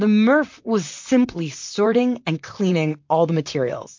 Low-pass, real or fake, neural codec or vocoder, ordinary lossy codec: 7.2 kHz; fake; vocoder, 44.1 kHz, 128 mel bands, Pupu-Vocoder; MP3, 48 kbps